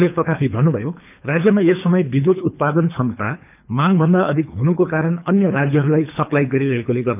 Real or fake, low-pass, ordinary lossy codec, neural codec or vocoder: fake; 3.6 kHz; none; codec, 24 kHz, 3 kbps, HILCodec